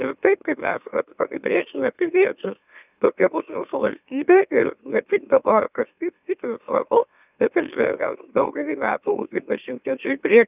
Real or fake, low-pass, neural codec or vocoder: fake; 3.6 kHz; autoencoder, 44.1 kHz, a latent of 192 numbers a frame, MeloTTS